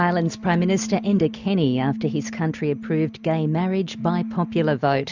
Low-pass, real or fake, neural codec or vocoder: 7.2 kHz; real; none